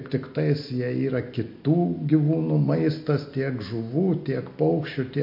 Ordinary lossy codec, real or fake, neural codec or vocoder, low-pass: MP3, 32 kbps; real; none; 5.4 kHz